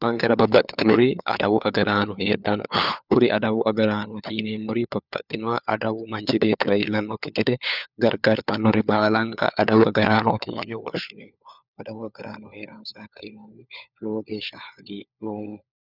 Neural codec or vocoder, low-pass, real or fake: codec, 16 kHz, 4 kbps, FunCodec, trained on LibriTTS, 50 frames a second; 5.4 kHz; fake